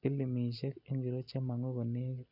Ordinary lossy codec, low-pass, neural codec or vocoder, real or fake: none; 5.4 kHz; none; real